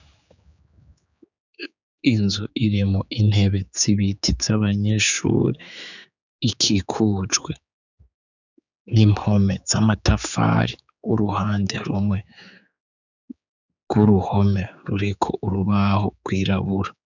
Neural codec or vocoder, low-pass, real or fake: codec, 16 kHz, 4 kbps, X-Codec, HuBERT features, trained on general audio; 7.2 kHz; fake